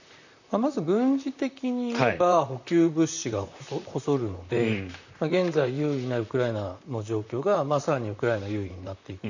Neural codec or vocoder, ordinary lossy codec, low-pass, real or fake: vocoder, 44.1 kHz, 128 mel bands, Pupu-Vocoder; none; 7.2 kHz; fake